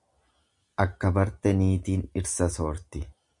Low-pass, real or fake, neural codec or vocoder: 10.8 kHz; real; none